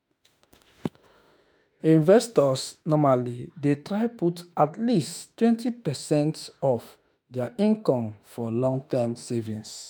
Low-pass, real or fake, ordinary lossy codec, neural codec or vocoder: none; fake; none; autoencoder, 48 kHz, 32 numbers a frame, DAC-VAE, trained on Japanese speech